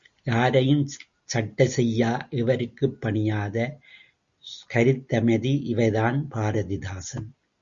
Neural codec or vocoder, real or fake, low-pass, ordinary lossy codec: none; real; 7.2 kHz; Opus, 64 kbps